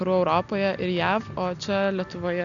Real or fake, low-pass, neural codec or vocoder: real; 7.2 kHz; none